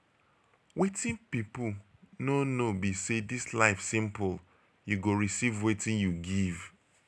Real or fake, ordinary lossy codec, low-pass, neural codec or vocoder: real; none; none; none